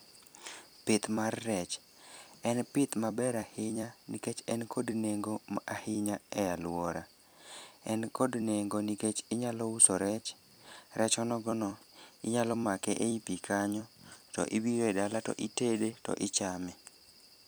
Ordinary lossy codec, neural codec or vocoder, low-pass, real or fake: none; vocoder, 44.1 kHz, 128 mel bands every 256 samples, BigVGAN v2; none; fake